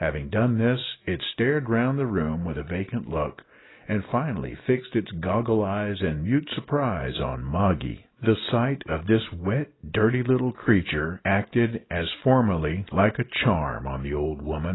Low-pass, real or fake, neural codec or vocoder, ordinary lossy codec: 7.2 kHz; real; none; AAC, 16 kbps